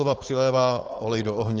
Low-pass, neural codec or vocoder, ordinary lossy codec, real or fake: 7.2 kHz; codec, 16 kHz, 4.8 kbps, FACodec; Opus, 16 kbps; fake